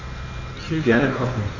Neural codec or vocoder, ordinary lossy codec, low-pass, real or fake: codec, 16 kHz in and 24 kHz out, 1.1 kbps, FireRedTTS-2 codec; none; 7.2 kHz; fake